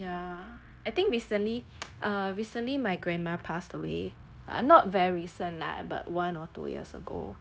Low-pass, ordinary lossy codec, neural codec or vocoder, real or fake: none; none; codec, 16 kHz, 0.9 kbps, LongCat-Audio-Codec; fake